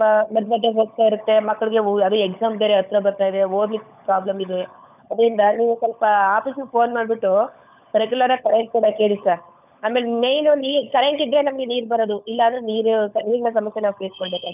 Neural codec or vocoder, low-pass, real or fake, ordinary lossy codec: codec, 16 kHz, 16 kbps, FunCodec, trained on LibriTTS, 50 frames a second; 3.6 kHz; fake; none